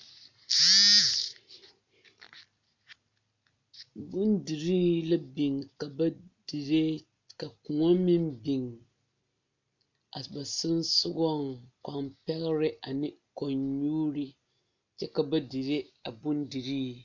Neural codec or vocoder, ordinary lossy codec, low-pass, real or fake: none; MP3, 64 kbps; 7.2 kHz; real